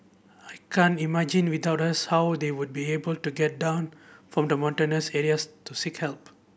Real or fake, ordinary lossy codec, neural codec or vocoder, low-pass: real; none; none; none